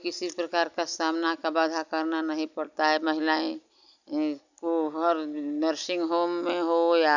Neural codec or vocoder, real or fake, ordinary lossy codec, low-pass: none; real; none; 7.2 kHz